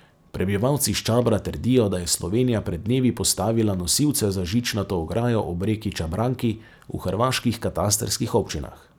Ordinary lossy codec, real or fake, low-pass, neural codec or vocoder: none; real; none; none